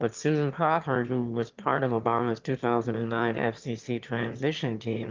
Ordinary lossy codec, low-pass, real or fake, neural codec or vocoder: Opus, 24 kbps; 7.2 kHz; fake; autoencoder, 22.05 kHz, a latent of 192 numbers a frame, VITS, trained on one speaker